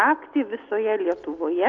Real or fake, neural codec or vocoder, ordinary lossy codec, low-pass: real; none; Opus, 32 kbps; 7.2 kHz